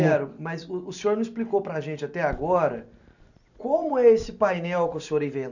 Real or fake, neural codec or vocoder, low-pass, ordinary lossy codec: real; none; 7.2 kHz; none